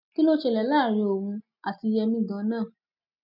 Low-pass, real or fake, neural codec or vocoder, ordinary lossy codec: 5.4 kHz; real; none; none